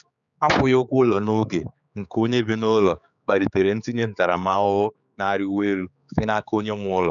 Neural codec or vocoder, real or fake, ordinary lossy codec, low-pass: codec, 16 kHz, 4 kbps, X-Codec, HuBERT features, trained on general audio; fake; none; 7.2 kHz